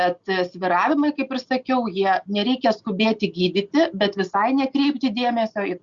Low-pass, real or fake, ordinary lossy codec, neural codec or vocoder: 7.2 kHz; real; Opus, 64 kbps; none